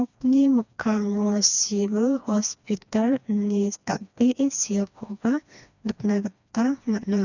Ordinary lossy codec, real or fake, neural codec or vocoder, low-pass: none; fake; codec, 16 kHz, 2 kbps, FreqCodec, smaller model; 7.2 kHz